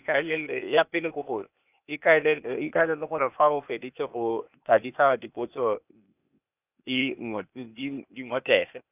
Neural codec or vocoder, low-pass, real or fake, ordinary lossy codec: codec, 16 kHz, 0.8 kbps, ZipCodec; 3.6 kHz; fake; none